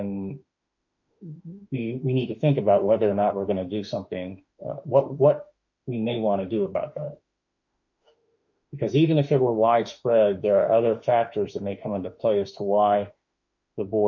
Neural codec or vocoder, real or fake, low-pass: autoencoder, 48 kHz, 32 numbers a frame, DAC-VAE, trained on Japanese speech; fake; 7.2 kHz